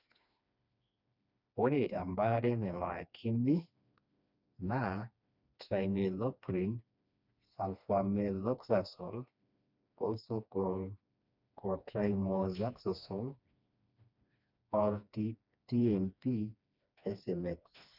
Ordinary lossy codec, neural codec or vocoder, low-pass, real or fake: none; codec, 16 kHz, 2 kbps, FreqCodec, smaller model; 5.4 kHz; fake